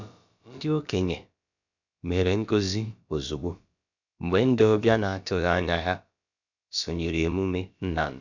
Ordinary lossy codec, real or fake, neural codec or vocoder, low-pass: none; fake; codec, 16 kHz, about 1 kbps, DyCAST, with the encoder's durations; 7.2 kHz